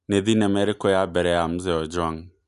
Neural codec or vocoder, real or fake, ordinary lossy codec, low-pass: none; real; none; 10.8 kHz